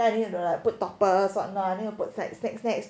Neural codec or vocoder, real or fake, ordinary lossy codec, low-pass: none; real; none; none